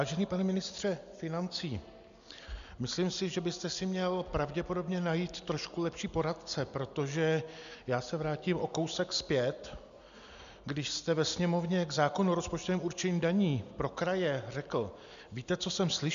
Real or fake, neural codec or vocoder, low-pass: real; none; 7.2 kHz